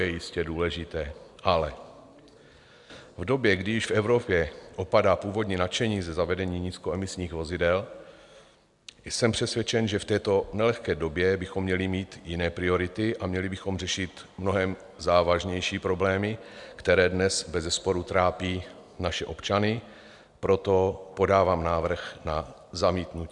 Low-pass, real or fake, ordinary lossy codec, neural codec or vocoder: 10.8 kHz; real; MP3, 96 kbps; none